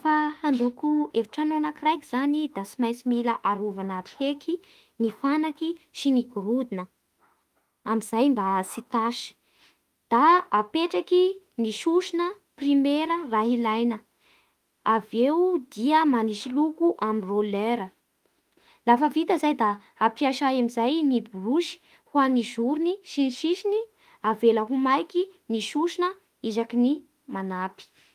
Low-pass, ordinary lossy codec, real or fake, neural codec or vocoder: 14.4 kHz; Opus, 32 kbps; fake; autoencoder, 48 kHz, 32 numbers a frame, DAC-VAE, trained on Japanese speech